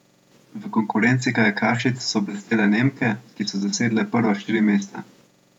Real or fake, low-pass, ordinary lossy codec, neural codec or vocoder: fake; 19.8 kHz; none; vocoder, 48 kHz, 128 mel bands, Vocos